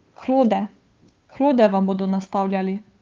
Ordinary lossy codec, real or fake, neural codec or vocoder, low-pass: Opus, 32 kbps; fake; codec, 16 kHz, 2 kbps, FunCodec, trained on Chinese and English, 25 frames a second; 7.2 kHz